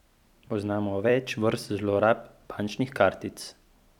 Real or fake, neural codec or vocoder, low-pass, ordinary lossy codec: fake; vocoder, 44.1 kHz, 128 mel bands every 256 samples, BigVGAN v2; 19.8 kHz; none